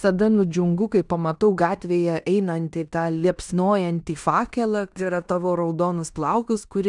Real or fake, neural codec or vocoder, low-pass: fake; codec, 16 kHz in and 24 kHz out, 0.9 kbps, LongCat-Audio-Codec, fine tuned four codebook decoder; 10.8 kHz